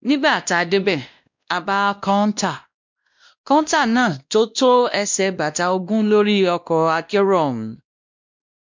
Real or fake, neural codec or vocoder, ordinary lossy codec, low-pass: fake; codec, 16 kHz, 1 kbps, X-Codec, WavLM features, trained on Multilingual LibriSpeech; MP3, 64 kbps; 7.2 kHz